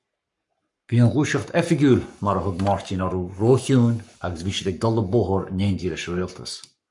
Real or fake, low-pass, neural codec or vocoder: fake; 10.8 kHz; codec, 44.1 kHz, 7.8 kbps, Pupu-Codec